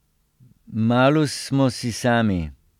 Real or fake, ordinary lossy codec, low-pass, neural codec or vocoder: real; none; 19.8 kHz; none